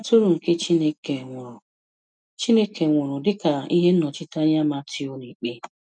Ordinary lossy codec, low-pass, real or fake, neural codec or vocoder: none; 9.9 kHz; real; none